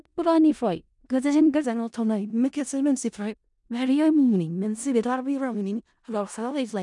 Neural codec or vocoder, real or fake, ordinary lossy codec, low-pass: codec, 16 kHz in and 24 kHz out, 0.4 kbps, LongCat-Audio-Codec, four codebook decoder; fake; none; 10.8 kHz